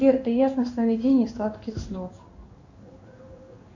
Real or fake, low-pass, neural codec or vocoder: fake; 7.2 kHz; codec, 16 kHz in and 24 kHz out, 1 kbps, XY-Tokenizer